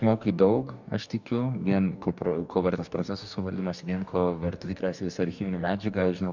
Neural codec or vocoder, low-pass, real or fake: codec, 44.1 kHz, 2.6 kbps, DAC; 7.2 kHz; fake